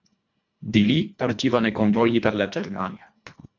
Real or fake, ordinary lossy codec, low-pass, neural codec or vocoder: fake; MP3, 64 kbps; 7.2 kHz; codec, 24 kHz, 1.5 kbps, HILCodec